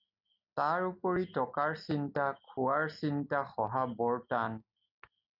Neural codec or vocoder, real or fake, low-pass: none; real; 5.4 kHz